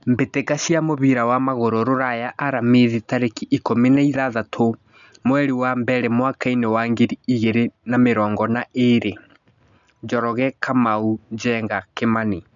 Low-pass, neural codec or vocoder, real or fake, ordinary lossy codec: 7.2 kHz; none; real; none